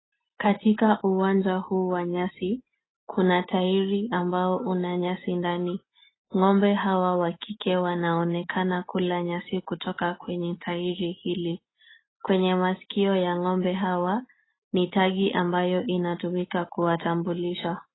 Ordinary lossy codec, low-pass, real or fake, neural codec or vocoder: AAC, 16 kbps; 7.2 kHz; real; none